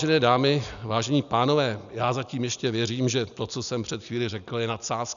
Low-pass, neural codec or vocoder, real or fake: 7.2 kHz; none; real